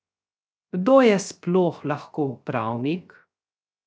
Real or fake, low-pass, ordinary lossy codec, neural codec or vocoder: fake; none; none; codec, 16 kHz, 0.3 kbps, FocalCodec